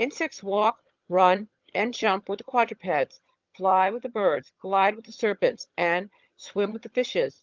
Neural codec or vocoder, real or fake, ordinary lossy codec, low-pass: vocoder, 22.05 kHz, 80 mel bands, HiFi-GAN; fake; Opus, 24 kbps; 7.2 kHz